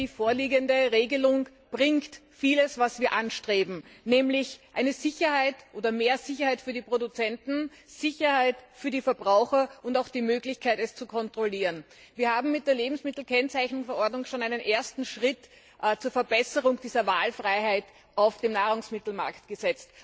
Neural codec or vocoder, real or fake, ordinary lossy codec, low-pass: none; real; none; none